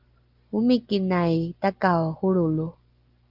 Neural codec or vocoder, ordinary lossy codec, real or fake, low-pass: none; Opus, 32 kbps; real; 5.4 kHz